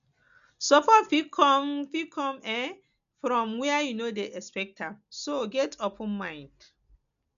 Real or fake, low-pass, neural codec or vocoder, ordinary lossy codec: real; 7.2 kHz; none; none